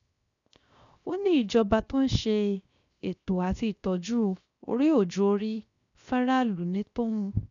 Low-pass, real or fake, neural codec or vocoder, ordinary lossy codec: 7.2 kHz; fake; codec, 16 kHz, 0.7 kbps, FocalCodec; none